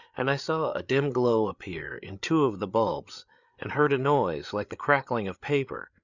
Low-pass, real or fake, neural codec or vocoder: 7.2 kHz; fake; codec, 16 kHz, 8 kbps, FreqCodec, larger model